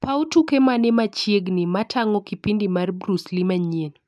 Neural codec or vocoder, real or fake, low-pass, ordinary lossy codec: none; real; none; none